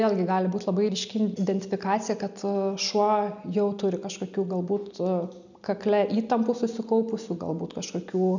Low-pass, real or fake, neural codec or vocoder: 7.2 kHz; real; none